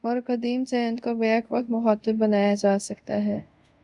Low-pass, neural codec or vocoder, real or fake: 10.8 kHz; codec, 24 kHz, 0.5 kbps, DualCodec; fake